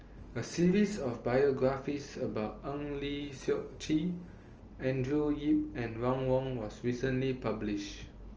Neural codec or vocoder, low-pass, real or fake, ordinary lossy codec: none; 7.2 kHz; real; Opus, 24 kbps